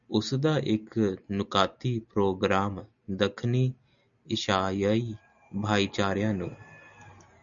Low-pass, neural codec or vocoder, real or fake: 7.2 kHz; none; real